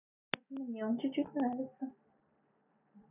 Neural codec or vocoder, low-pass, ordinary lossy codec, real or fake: none; 3.6 kHz; none; real